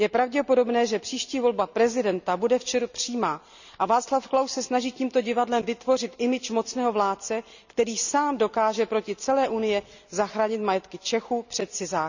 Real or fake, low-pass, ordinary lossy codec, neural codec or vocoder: real; 7.2 kHz; none; none